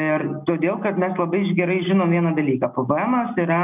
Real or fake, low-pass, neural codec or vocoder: real; 3.6 kHz; none